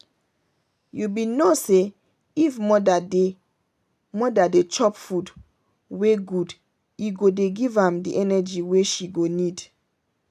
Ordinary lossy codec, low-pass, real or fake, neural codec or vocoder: none; 14.4 kHz; real; none